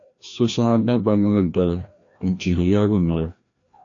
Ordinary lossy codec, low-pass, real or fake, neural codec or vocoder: AAC, 48 kbps; 7.2 kHz; fake; codec, 16 kHz, 1 kbps, FreqCodec, larger model